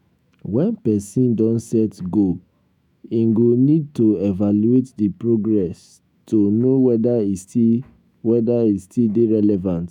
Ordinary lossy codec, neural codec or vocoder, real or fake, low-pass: none; autoencoder, 48 kHz, 128 numbers a frame, DAC-VAE, trained on Japanese speech; fake; 19.8 kHz